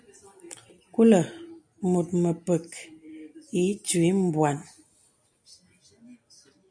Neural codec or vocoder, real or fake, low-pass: none; real; 9.9 kHz